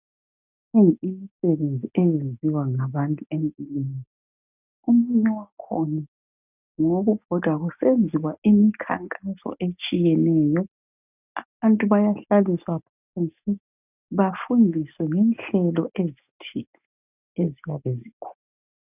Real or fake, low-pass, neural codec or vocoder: real; 3.6 kHz; none